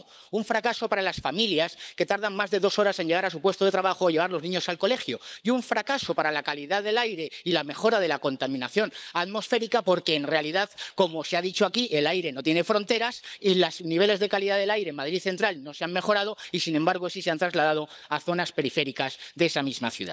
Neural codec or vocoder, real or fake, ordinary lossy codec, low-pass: codec, 16 kHz, 16 kbps, FunCodec, trained on LibriTTS, 50 frames a second; fake; none; none